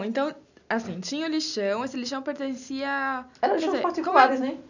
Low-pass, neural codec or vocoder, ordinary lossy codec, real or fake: 7.2 kHz; none; none; real